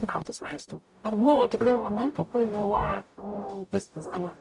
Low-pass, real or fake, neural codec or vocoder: 10.8 kHz; fake; codec, 44.1 kHz, 0.9 kbps, DAC